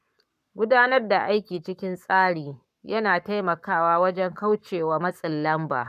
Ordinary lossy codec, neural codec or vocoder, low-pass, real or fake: none; codec, 44.1 kHz, 7.8 kbps, Pupu-Codec; 14.4 kHz; fake